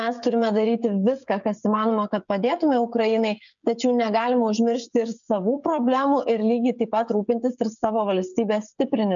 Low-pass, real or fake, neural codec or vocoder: 7.2 kHz; fake; codec, 16 kHz, 16 kbps, FreqCodec, smaller model